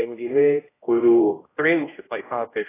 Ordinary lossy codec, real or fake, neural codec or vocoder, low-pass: AAC, 16 kbps; fake; codec, 16 kHz, 0.5 kbps, X-Codec, HuBERT features, trained on general audio; 3.6 kHz